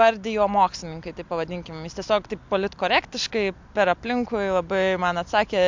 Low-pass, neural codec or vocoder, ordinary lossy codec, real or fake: 7.2 kHz; none; MP3, 64 kbps; real